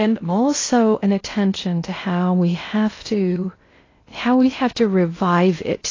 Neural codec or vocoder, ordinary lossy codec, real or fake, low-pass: codec, 16 kHz in and 24 kHz out, 0.6 kbps, FocalCodec, streaming, 2048 codes; AAC, 32 kbps; fake; 7.2 kHz